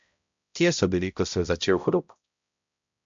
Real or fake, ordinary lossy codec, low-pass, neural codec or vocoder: fake; MP3, 64 kbps; 7.2 kHz; codec, 16 kHz, 0.5 kbps, X-Codec, HuBERT features, trained on balanced general audio